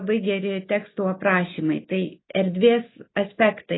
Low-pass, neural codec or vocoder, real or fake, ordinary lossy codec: 7.2 kHz; none; real; AAC, 16 kbps